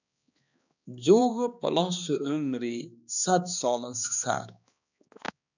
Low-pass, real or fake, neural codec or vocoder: 7.2 kHz; fake; codec, 16 kHz, 2 kbps, X-Codec, HuBERT features, trained on balanced general audio